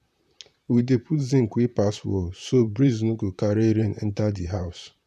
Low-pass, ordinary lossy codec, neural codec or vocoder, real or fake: 14.4 kHz; none; vocoder, 44.1 kHz, 128 mel bands, Pupu-Vocoder; fake